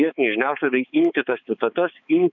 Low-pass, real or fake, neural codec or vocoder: 7.2 kHz; fake; codec, 16 kHz, 4 kbps, X-Codec, HuBERT features, trained on balanced general audio